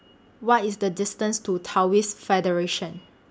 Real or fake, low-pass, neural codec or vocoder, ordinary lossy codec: real; none; none; none